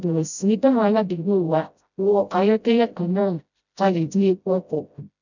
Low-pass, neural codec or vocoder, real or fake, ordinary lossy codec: 7.2 kHz; codec, 16 kHz, 0.5 kbps, FreqCodec, smaller model; fake; none